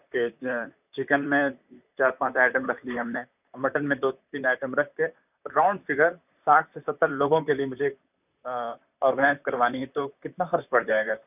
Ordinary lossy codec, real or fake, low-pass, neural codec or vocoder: none; fake; 3.6 kHz; vocoder, 44.1 kHz, 128 mel bands, Pupu-Vocoder